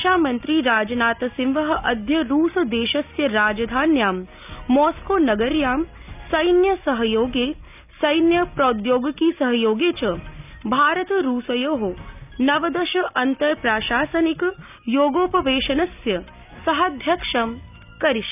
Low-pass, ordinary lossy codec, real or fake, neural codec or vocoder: 3.6 kHz; none; real; none